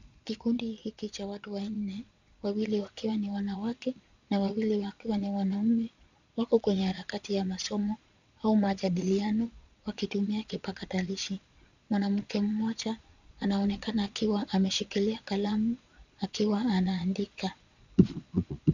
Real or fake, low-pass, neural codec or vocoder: fake; 7.2 kHz; vocoder, 22.05 kHz, 80 mel bands, Vocos